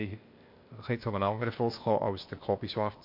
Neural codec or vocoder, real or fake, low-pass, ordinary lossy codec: codec, 16 kHz, 0.8 kbps, ZipCodec; fake; 5.4 kHz; MP3, 32 kbps